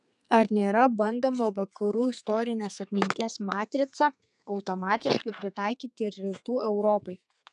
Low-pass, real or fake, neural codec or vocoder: 10.8 kHz; fake; codec, 32 kHz, 1.9 kbps, SNAC